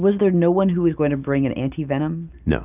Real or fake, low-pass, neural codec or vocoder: real; 3.6 kHz; none